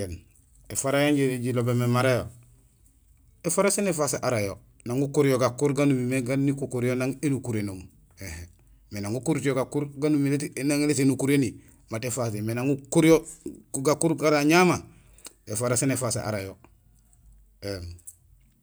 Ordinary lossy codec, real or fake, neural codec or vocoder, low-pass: none; real; none; none